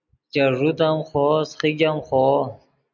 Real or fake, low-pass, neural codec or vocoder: real; 7.2 kHz; none